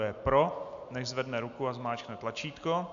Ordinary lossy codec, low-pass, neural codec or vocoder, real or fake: AAC, 64 kbps; 7.2 kHz; none; real